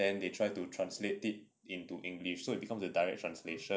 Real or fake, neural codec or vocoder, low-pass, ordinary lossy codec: real; none; none; none